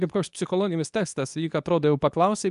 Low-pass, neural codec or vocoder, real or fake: 10.8 kHz; codec, 24 kHz, 0.9 kbps, WavTokenizer, medium speech release version 2; fake